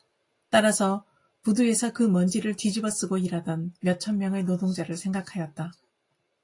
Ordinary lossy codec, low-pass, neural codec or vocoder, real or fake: AAC, 48 kbps; 10.8 kHz; none; real